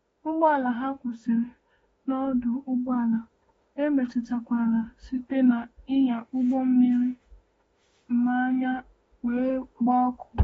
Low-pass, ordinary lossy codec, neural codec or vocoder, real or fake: 19.8 kHz; AAC, 24 kbps; autoencoder, 48 kHz, 32 numbers a frame, DAC-VAE, trained on Japanese speech; fake